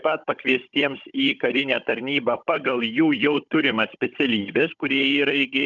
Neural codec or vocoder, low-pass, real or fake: codec, 16 kHz, 16 kbps, FunCodec, trained on Chinese and English, 50 frames a second; 7.2 kHz; fake